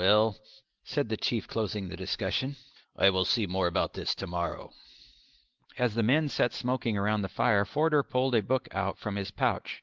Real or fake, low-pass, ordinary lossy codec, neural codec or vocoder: real; 7.2 kHz; Opus, 16 kbps; none